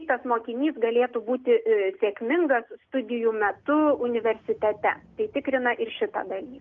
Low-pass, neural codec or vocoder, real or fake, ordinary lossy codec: 7.2 kHz; none; real; Opus, 32 kbps